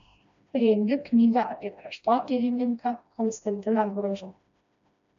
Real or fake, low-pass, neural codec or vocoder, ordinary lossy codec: fake; 7.2 kHz; codec, 16 kHz, 1 kbps, FreqCodec, smaller model; AAC, 96 kbps